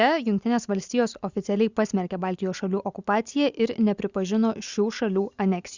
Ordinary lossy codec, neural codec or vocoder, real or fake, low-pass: Opus, 64 kbps; none; real; 7.2 kHz